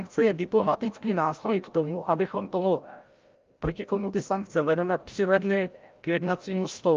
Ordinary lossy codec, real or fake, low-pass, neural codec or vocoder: Opus, 32 kbps; fake; 7.2 kHz; codec, 16 kHz, 0.5 kbps, FreqCodec, larger model